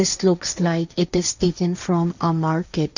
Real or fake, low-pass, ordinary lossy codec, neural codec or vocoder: fake; 7.2 kHz; none; codec, 16 kHz, 1.1 kbps, Voila-Tokenizer